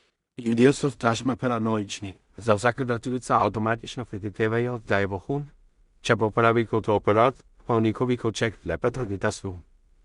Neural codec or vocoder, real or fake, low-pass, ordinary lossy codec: codec, 16 kHz in and 24 kHz out, 0.4 kbps, LongCat-Audio-Codec, two codebook decoder; fake; 10.8 kHz; Opus, 64 kbps